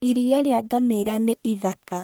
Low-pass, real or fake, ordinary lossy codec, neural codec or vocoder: none; fake; none; codec, 44.1 kHz, 1.7 kbps, Pupu-Codec